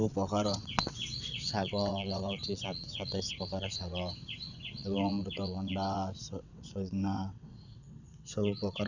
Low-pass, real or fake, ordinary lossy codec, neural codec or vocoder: 7.2 kHz; fake; none; vocoder, 22.05 kHz, 80 mel bands, WaveNeXt